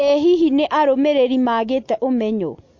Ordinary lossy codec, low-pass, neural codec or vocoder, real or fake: MP3, 48 kbps; 7.2 kHz; none; real